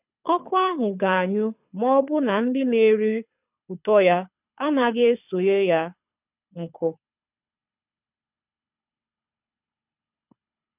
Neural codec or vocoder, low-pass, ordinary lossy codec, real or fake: codec, 24 kHz, 6 kbps, HILCodec; 3.6 kHz; none; fake